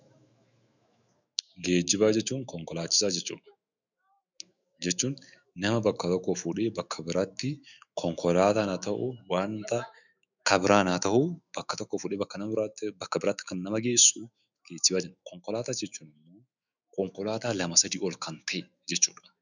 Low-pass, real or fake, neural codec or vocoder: 7.2 kHz; fake; autoencoder, 48 kHz, 128 numbers a frame, DAC-VAE, trained on Japanese speech